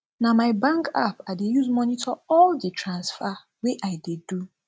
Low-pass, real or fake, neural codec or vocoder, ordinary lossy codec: none; real; none; none